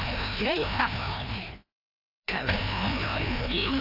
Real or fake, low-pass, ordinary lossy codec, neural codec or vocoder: fake; 5.4 kHz; AAC, 32 kbps; codec, 16 kHz, 1 kbps, FreqCodec, larger model